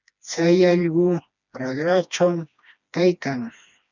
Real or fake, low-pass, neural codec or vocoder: fake; 7.2 kHz; codec, 16 kHz, 2 kbps, FreqCodec, smaller model